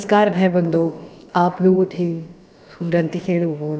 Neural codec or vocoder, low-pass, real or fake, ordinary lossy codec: codec, 16 kHz, about 1 kbps, DyCAST, with the encoder's durations; none; fake; none